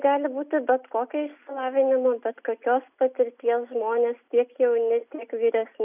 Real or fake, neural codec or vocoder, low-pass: real; none; 3.6 kHz